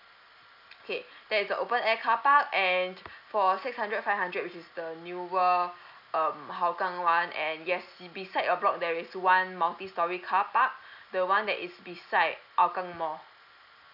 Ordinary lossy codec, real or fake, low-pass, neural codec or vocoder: AAC, 48 kbps; real; 5.4 kHz; none